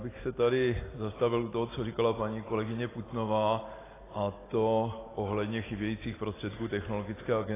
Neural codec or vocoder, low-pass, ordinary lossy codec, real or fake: none; 3.6 kHz; AAC, 16 kbps; real